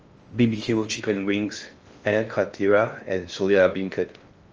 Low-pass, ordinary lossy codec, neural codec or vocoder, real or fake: 7.2 kHz; Opus, 24 kbps; codec, 16 kHz in and 24 kHz out, 0.6 kbps, FocalCodec, streaming, 4096 codes; fake